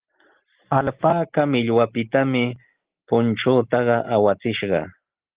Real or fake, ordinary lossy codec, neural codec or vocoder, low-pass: real; Opus, 16 kbps; none; 3.6 kHz